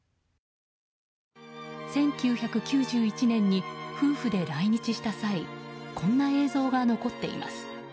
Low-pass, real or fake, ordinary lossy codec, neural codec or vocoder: none; real; none; none